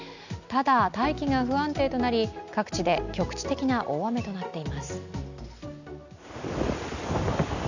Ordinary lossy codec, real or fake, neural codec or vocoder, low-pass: none; real; none; 7.2 kHz